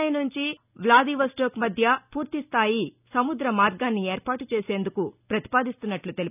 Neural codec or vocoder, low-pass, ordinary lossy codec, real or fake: none; 3.6 kHz; none; real